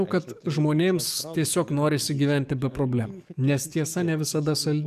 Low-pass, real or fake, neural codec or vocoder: 14.4 kHz; fake; codec, 44.1 kHz, 7.8 kbps, DAC